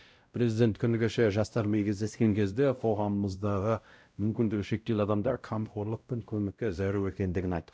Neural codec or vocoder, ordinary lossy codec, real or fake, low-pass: codec, 16 kHz, 0.5 kbps, X-Codec, WavLM features, trained on Multilingual LibriSpeech; none; fake; none